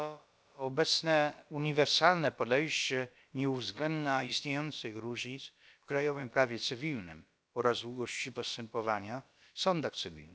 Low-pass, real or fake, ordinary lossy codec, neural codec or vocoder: none; fake; none; codec, 16 kHz, about 1 kbps, DyCAST, with the encoder's durations